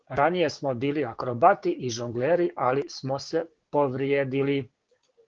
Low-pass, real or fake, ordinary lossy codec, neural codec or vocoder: 7.2 kHz; fake; Opus, 16 kbps; codec, 16 kHz, 6 kbps, DAC